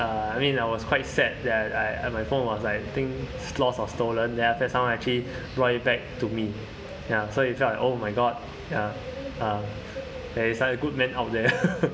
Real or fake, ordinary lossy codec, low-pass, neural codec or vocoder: real; none; none; none